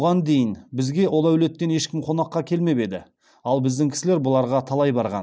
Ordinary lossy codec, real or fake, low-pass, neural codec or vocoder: none; real; none; none